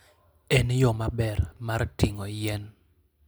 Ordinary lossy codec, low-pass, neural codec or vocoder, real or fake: none; none; none; real